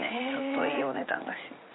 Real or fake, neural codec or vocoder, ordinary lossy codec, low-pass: fake; vocoder, 44.1 kHz, 80 mel bands, Vocos; AAC, 16 kbps; 7.2 kHz